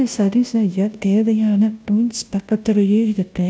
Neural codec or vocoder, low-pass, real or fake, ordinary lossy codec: codec, 16 kHz, 0.5 kbps, FunCodec, trained on Chinese and English, 25 frames a second; none; fake; none